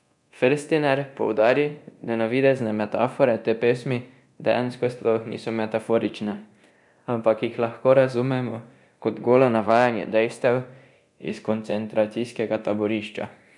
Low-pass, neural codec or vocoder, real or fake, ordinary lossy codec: 10.8 kHz; codec, 24 kHz, 0.9 kbps, DualCodec; fake; none